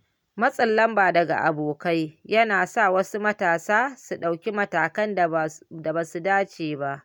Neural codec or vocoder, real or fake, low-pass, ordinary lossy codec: none; real; 19.8 kHz; none